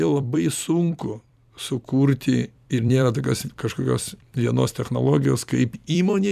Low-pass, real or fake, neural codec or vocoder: 14.4 kHz; fake; vocoder, 44.1 kHz, 128 mel bands every 256 samples, BigVGAN v2